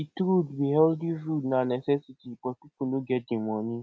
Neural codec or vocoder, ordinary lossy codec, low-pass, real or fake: none; none; none; real